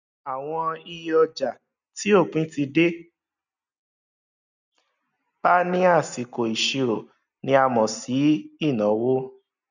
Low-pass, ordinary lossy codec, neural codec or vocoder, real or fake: 7.2 kHz; none; none; real